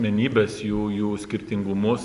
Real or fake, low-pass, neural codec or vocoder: real; 10.8 kHz; none